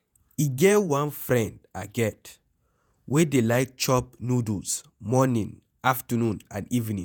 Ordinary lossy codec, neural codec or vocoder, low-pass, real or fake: none; vocoder, 48 kHz, 128 mel bands, Vocos; none; fake